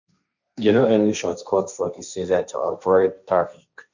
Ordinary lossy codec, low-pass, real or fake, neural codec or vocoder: none; 7.2 kHz; fake; codec, 16 kHz, 1.1 kbps, Voila-Tokenizer